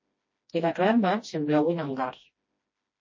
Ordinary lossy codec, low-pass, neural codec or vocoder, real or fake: MP3, 32 kbps; 7.2 kHz; codec, 16 kHz, 1 kbps, FreqCodec, smaller model; fake